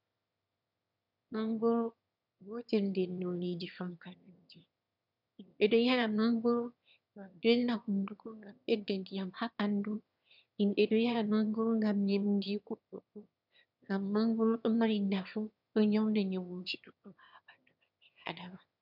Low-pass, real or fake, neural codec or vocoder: 5.4 kHz; fake; autoencoder, 22.05 kHz, a latent of 192 numbers a frame, VITS, trained on one speaker